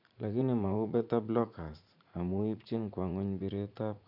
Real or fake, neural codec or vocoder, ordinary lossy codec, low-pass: fake; vocoder, 44.1 kHz, 80 mel bands, Vocos; none; 5.4 kHz